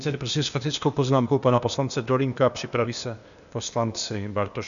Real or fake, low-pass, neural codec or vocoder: fake; 7.2 kHz; codec, 16 kHz, 0.8 kbps, ZipCodec